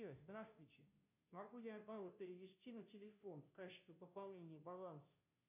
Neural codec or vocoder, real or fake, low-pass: codec, 16 kHz, 0.5 kbps, FunCodec, trained on Chinese and English, 25 frames a second; fake; 3.6 kHz